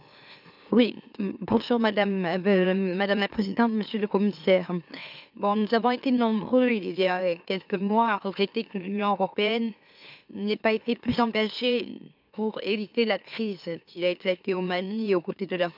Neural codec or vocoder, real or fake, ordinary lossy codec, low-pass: autoencoder, 44.1 kHz, a latent of 192 numbers a frame, MeloTTS; fake; none; 5.4 kHz